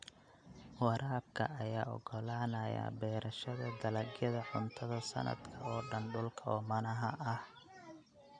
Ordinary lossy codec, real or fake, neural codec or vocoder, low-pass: none; real; none; 9.9 kHz